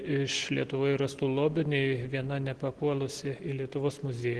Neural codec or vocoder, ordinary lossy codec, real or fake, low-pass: none; Opus, 16 kbps; real; 10.8 kHz